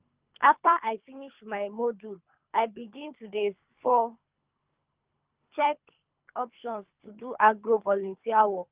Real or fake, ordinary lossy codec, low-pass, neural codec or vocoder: fake; Opus, 32 kbps; 3.6 kHz; codec, 24 kHz, 3 kbps, HILCodec